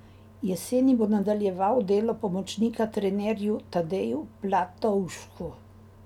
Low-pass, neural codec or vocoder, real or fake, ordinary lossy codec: 19.8 kHz; none; real; none